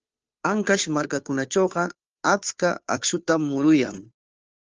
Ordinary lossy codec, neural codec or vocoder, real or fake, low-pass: Opus, 24 kbps; codec, 16 kHz, 2 kbps, FunCodec, trained on Chinese and English, 25 frames a second; fake; 7.2 kHz